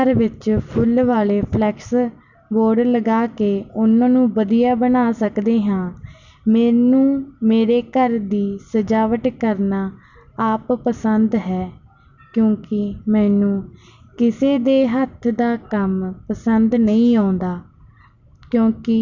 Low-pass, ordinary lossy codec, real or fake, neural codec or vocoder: 7.2 kHz; none; real; none